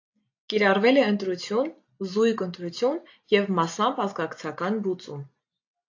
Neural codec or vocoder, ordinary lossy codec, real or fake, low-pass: none; AAC, 48 kbps; real; 7.2 kHz